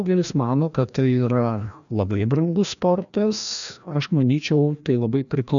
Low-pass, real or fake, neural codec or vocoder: 7.2 kHz; fake; codec, 16 kHz, 1 kbps, FreqCodec, larger model